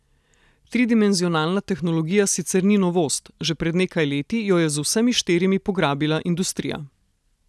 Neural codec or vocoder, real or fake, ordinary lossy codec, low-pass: none; real; none; none